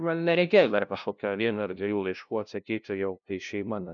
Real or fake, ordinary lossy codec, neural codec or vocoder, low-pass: fake; MP3, 96 kbps; codec, 16 kHz, 0.5 kbps, FunCodec, trained on LibriTTS, 25 frames a second; 7.2 kHz